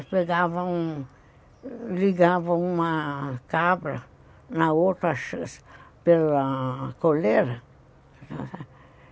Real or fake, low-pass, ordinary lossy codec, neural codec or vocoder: real; none; none; none